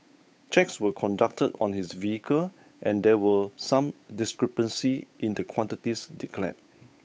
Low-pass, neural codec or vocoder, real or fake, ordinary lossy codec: none; codec, 16 kHz, 8 kbps, FunCodec, trained on Chinese and English, 25 frames a second; fake; none